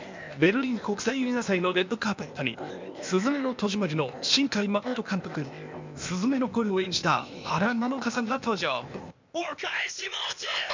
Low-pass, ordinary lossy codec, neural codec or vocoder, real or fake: 7.2 kHz; MP3, 64 kbps; codec, 16 kHz, 0.8 kbps, ZipCodec; fake